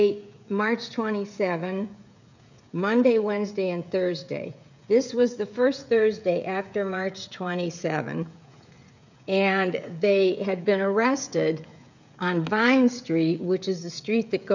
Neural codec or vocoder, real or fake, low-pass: codec, 16 kHz, 16 kbps, FreqCodec, smaller model; fake; 7.2 kHz